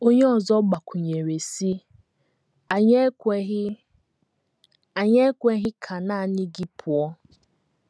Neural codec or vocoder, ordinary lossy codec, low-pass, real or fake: none; none; none; real